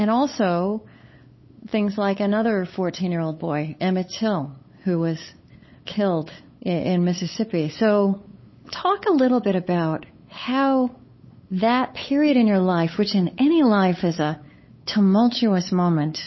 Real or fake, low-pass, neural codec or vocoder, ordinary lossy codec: fake; 7.2 kHz; codec, 16 kHz, 8 kbps, FunCodec, trained on LibriTTS, 25 frames a second; MP3, 24 kbps